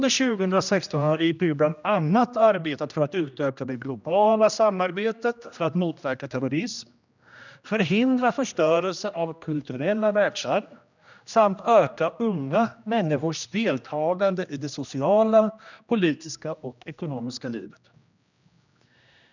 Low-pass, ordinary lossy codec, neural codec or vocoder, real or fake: 7.2 kHz; none; codec, 16 kHz, 1 kbps, X-Codec, HuBERT features, trained on general audio; fake